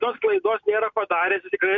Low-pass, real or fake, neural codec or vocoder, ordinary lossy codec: 7.2 kHz; real; none; MP3, 64 kbps